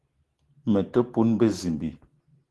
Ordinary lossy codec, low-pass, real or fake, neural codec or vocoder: Opus, 16 kbps; 10.8 kHz; real; none